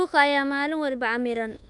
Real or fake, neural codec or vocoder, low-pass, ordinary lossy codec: fake; codec, 24 kHz, 1.2 kbps, DualCodec; 10.8 kHz; none